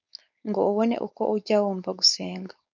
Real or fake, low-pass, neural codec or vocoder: fake; 7.2 kHz; codec, 16 kHz, 4.8 kbps, FACodec